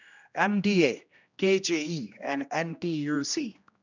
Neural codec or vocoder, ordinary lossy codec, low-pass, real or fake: codec, 16 kHz, 1 kbps, X-Codec, HuBERT features, trained on general audio; none; 7.2 kHz; fake